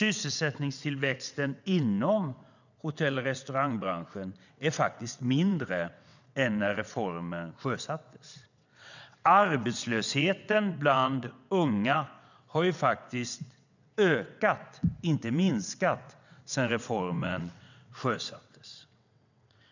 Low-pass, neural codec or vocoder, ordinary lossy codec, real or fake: 7.2 kHz; vocoder, 22.05 kHz, 80 mel bands, WaveNeXt; none; fake